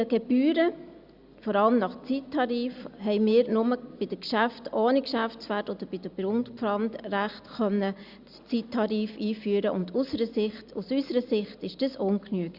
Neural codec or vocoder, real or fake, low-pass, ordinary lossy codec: none; real; 5.4 kHz; Opus, 64 kbps